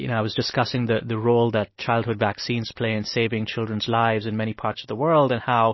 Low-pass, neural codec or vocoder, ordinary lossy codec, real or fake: 7.2 kHz; none; MP3, 24 kbps; real